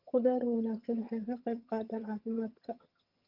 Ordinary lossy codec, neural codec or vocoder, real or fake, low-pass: Opus, 24 kbps; vocoder, 22.05 kHz, 80 mel bands, HiFi-GAN; fake; 5.4 kHz